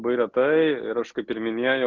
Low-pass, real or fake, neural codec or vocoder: 7.2 kHz; real; none